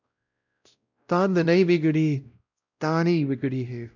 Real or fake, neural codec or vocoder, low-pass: fake; codec, 16 kHz, 0.5 kbps, X-Codec, WavLM features, trained on Multilingual LibriSpeech; 7.2 kHz